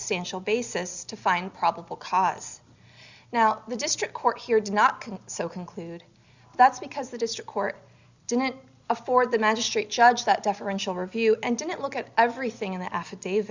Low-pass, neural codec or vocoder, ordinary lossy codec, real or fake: 7.2 kHz; none; Opus, 64 kbps; real